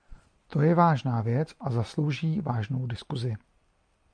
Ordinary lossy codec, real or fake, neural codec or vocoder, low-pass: MP3, 64 kbps; real; none; 9.9 kHz